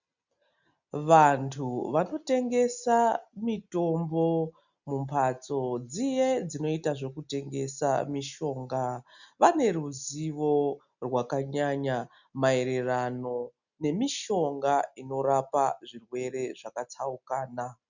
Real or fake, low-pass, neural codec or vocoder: real; 7.2 kHz; none